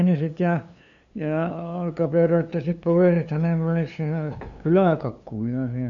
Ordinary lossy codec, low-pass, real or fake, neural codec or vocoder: none; 7.2 kHz; fake; codec, 16 kHz, 2 kbps, FunCodec, trained on LibriTTS, 25 frames a second